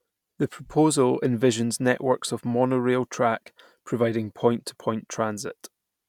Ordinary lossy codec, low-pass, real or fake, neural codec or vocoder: none; 19.8 kHz; real; none